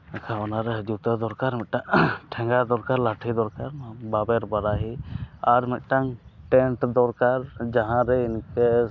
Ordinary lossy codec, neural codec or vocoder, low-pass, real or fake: none; none; 7.2 kHz; real